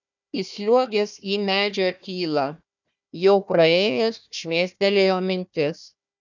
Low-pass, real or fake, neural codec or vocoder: 7.2 kHz; fake; codec, 16 kHz, 1 kbps, FunCodec, trained on Chinese and English, 50 frames a second